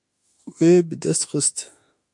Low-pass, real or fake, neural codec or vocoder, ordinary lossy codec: 10.8 kHz; fake; autoencoder, 48 kHz, 32 numbers a frame, DAC-VAE, trained on Japanese speech; MP3, 64 kbps